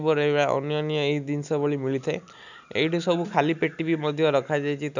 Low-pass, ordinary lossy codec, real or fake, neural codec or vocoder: 7.2 kHz; none; real; none